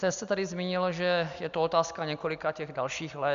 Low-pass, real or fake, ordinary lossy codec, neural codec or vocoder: 7.2 kHz; real; AAC, 96 kbps; none